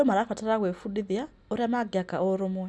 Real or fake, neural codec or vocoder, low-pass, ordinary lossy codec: real; none; none; none